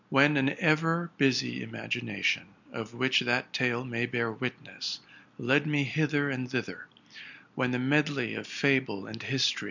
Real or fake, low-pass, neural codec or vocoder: real; 7.2 kHz; none